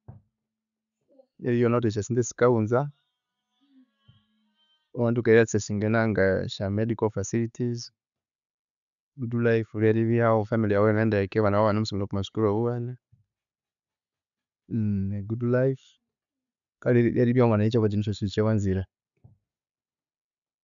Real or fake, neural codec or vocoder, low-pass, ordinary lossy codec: real; none; 7.2 kHz; none